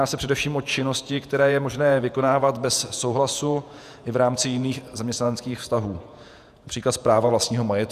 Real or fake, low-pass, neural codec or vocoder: fake; 14.4 kHz; vocoder, 48 kHz, 128 mel bands, Vocos